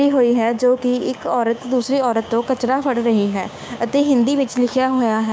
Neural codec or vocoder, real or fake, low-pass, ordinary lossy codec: codec, 16 kHz, 6 kbps, DAC; fake; none; none